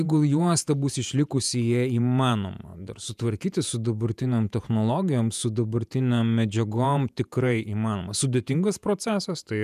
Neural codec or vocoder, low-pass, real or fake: vocoder, 48 kHz, 128 mel bands, Vocos; 14.4 kHz; fake